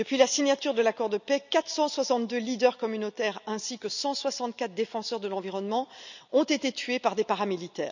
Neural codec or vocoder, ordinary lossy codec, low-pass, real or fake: none; none; 7.2 kHz; real